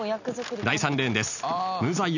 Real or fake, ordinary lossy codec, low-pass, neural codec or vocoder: real; none; 7.2 kHz; none